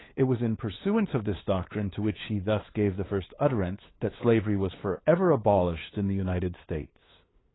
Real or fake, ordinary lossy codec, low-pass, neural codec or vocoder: fake; AAC, 16 kbps; 7.2 kHz; codec, 16 kHz, 8 kbps, FunCodec, trained on LibriTTS, 25 frames a second